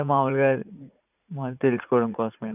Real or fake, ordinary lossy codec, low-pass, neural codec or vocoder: fake; none; 3.6 kHz; vocoder, 44.1 kHz, 80 mel bands, Vocos